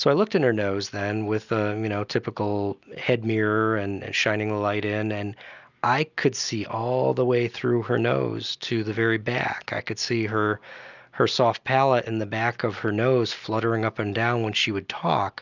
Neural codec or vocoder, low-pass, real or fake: none; 7.2 kHz; real